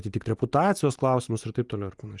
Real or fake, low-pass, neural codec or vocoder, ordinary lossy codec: real; 10.8 kHz; none; Opus, 32 kbps